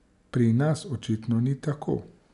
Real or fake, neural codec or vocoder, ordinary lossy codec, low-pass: real; none; none; 10.8 kHz